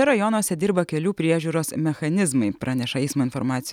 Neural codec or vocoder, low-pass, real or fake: none; 19.8 kHz; real